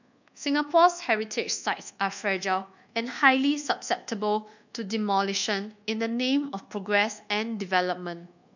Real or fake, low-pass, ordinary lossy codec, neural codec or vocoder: fake; 7.2 kHz; none; codec, 24 kHz, 1.2 kbps, DualCodec